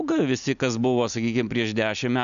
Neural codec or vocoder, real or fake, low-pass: none; real; 7.2 kHz